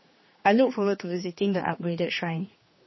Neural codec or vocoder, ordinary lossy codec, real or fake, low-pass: codec, 16 kHz, 2 kbps, X-Codec, HuBERT features, trained on general audio; MP3, 24 kbps; fake; 7.2 kHz